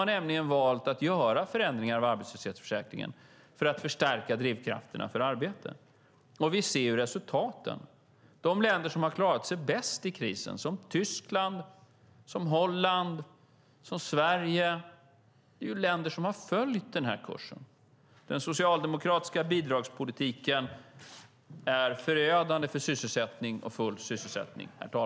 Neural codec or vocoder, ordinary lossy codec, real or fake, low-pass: none; none; real; none